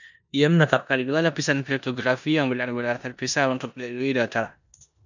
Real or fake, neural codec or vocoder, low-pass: fake; codec, 16 kHz in and 24 kHz out, 0.9 kbps, LongCat-Audio-Codec, four codebook decoder; 7.2 kHz